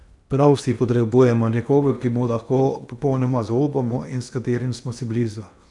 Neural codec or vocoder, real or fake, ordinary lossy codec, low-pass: codec, 16 kHz in and 24 kHz out, 0.8 kbps, FocalCodec, streaming, 65536 codes; fake; none; 10.8 kHz